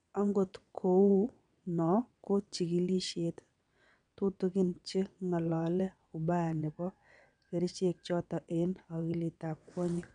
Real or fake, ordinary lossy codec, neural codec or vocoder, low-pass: fake; none; vocoder, 22.05 kHz, 80 mel bands, WaveNeXt; 9.9 kHz